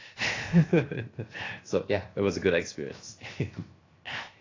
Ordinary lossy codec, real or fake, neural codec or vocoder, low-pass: AAC, 32 kbps; fake; codec, 16 kHz, 0.7 kbps, FocalCodec; 7.2 kHz